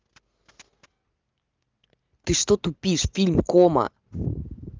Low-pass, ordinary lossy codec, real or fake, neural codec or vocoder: 7.2 kHz; Opus, 24 kbps; real; none